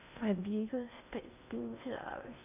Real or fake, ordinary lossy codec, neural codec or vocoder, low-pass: fake; none; codec, 16 kHz in and 24 kHz out, 0.6 kbps, FocalCodec, streaming, 2048 codes; 3.6 kHz